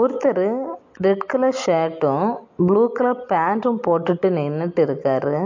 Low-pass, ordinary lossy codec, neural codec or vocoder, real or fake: 7.2 kHz; MP3, 48 kbps; none; real